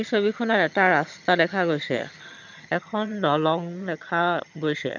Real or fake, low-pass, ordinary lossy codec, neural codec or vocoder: fake; 7.2 kHz; none; vocoder, 22.05 kHz, 80 mel bands, HiFi-GAN